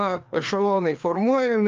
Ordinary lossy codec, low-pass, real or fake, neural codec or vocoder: Opus, 24 kbps; 7.2 kHz; fake; codec, 16 kHz, 2 kbps, FreqCodec, larger model